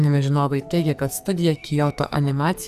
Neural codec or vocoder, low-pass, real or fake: codec, 44.1 kHz, 2.6 kbps, SNAC; 14.4 kHz; fake